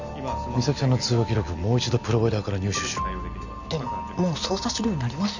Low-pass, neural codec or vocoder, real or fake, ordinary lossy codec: 7.2 kHz; none; real; none